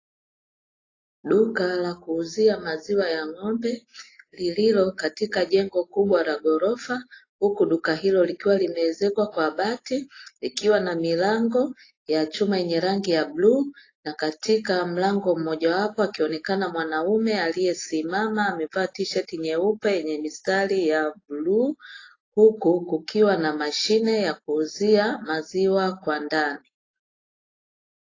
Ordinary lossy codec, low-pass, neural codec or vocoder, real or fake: AAC, 32 kbps; 7.2 kHz; none; real